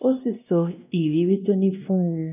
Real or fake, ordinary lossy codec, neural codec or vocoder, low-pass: fake; none; codec, 16 kHz, 1 kbps, X-Codec, WavLM features, trained on Multilingual LibriSpeech; 3.6 kHz